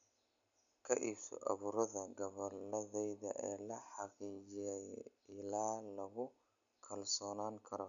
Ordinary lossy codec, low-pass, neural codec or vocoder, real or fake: none; 7.2 kHz; none; real